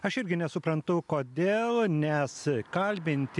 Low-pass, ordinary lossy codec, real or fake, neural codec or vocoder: 10.8 kHz; MP3, 96 kbps; real; none